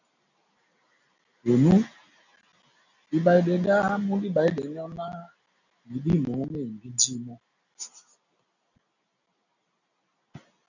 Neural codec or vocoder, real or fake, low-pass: none; real; 7.2 kHz